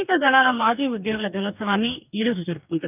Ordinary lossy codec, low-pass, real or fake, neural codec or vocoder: none; 3.6 kHz; fake; codec, 44.1 kHz, 2.6 kbps, DAC